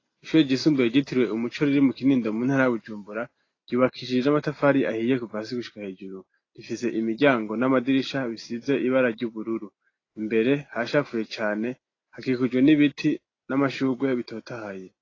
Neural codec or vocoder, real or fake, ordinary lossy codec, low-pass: none; real; AAC, 32 kbps; 7.2 kHz